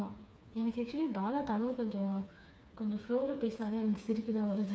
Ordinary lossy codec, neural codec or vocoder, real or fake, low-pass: none; codec, 16 kHz, 4 kbps, FreqCodec, smaller model; fake; none